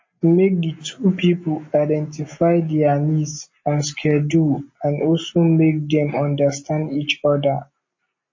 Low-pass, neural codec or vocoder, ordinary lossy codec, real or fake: 7.2 kHz; none; MP3, 32 kbps; real